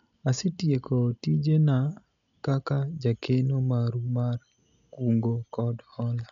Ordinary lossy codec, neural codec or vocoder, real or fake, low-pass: none; none; real; 7.2 kHz